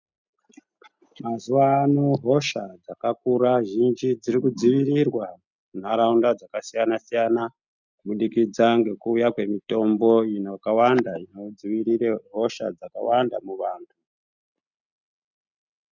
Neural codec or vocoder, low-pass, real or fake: none; 7.2 kHz; real